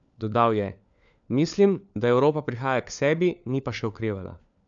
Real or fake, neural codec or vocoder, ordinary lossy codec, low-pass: fake; codec, 16 kHz, 4 kbps, FunCodec, trained on LibriTTS, 50 frames a second; none; 7.2 kHz